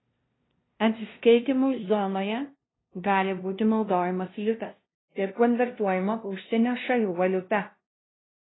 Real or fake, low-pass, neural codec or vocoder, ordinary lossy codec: fake; 7.2 kHz; codec, 16 kHz, 0.5 kbps, FunCodec, trained on LibriTTS, 25 frames a second; AAC, 16 kbps